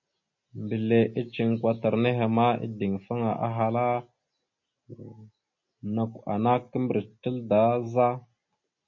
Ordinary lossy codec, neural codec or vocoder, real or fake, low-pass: MP3, 32 kbps; none; real; 7.2 kHz